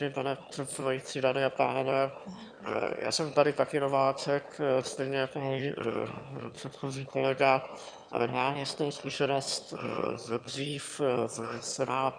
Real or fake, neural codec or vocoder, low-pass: fake; autoencoder, 22.05 kHz, a latent of 192 numbers a frame, VITS, trained on one speaker; 9.9 kHz